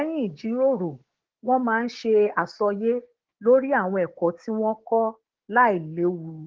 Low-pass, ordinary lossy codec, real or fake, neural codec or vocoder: 7.2 kHz; Opus, 16 kbps; fake; codec, 16 kHz, 6 kbps, DAC